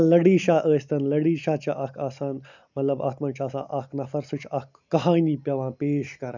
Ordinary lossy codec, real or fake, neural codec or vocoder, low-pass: none; real; none; 7.2 kHz